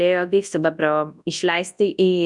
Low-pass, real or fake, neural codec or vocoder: 10.8 kHz; fake; codec, 24 kHz, 0.9 kbps, WavTokenizer, large speech release